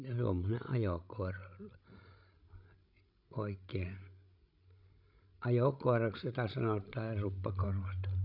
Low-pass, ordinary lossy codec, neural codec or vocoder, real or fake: 5.4 kHz; none; codec, 16 kHz, 16 kbps, FreqCodec, larger model; fake